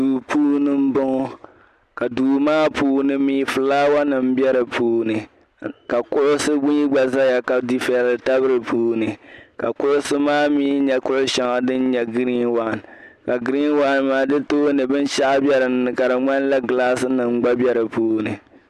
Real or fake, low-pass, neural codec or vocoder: real; 14.4 kHz; none